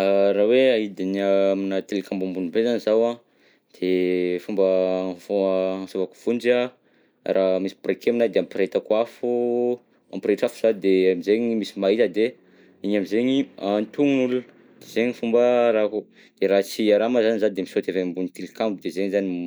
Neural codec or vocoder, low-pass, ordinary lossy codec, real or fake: none; none; none; real